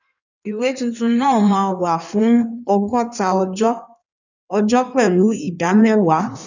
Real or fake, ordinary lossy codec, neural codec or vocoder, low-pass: fake; none; codec, 16 kHz in and 24 kHz out, 1.1 kbps, FireRedTTS-2 codec; 7.2 kHz